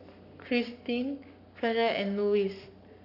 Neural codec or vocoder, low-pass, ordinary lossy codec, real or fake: codec, 44.1 kHz, 7.8 kbps, Pupu-Codec; 5.4 kHz; none; fake